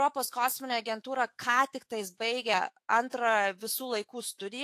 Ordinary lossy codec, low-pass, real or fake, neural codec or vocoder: AAC, 64 kbps; 14.4 kHz; fake; autoencoder, 48 kHz, 128 numbers a frame, DAC-VAE, trained on Japanese speech